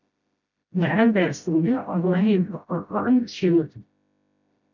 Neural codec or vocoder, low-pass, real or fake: codec, 16 kHz, 0.5 kbps, FreqCodec, smaller model; 7.2 kHz; fake